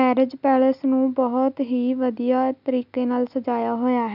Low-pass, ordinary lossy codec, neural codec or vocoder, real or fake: 5.4 kHz; none; none; real